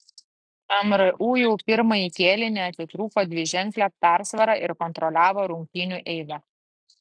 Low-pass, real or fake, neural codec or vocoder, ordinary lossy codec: 9.9 kHz; real; none; AAC, 64 kbps